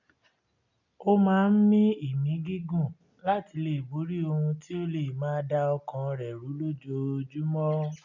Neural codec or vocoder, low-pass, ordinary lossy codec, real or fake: none; 7.2 kHz; none; real